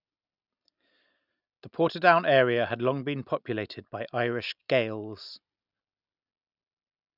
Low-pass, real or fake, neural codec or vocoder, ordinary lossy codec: 5.4 kHz; real; none; none